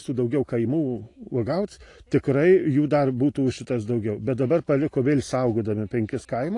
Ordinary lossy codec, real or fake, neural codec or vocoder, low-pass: AAC, 48 kbps; real; none; 10.8 kHz